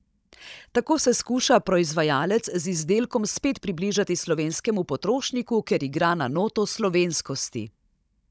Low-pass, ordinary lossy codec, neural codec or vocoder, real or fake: none; none; codec, 16 kHz, 16 kbps, FunCodec, trained on Chinese and English, 50 frames a second; fake